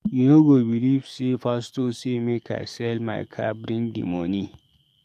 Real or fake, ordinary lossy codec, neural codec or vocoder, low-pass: fake; none; codec, 44.1 kHz, 7.8 kbps, DAC; 14.4 kHz